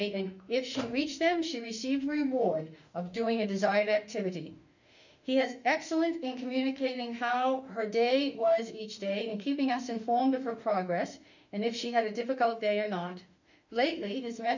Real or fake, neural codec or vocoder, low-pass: fake; autoencoder, 48 kHz, 32 numbers a frame, DAC-VAE, trained on Japanese speech; 7.2 kHz